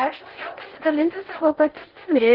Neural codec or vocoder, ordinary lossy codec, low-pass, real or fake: codec, 16 kHz in and 24 kHz out, 0.6 kbps, FocalCodec, streaming, 4096 codes; Opus, 16 kbps; 5.4 kHz; fake